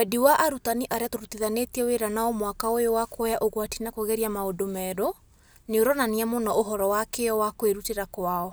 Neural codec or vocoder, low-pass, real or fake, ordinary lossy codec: none; none; real; none